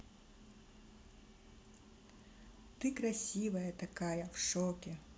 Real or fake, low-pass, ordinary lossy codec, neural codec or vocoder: real; none; none; none